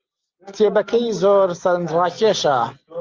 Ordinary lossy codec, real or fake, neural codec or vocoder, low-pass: Opus, 24 kbps; real; none; 7.2 kHz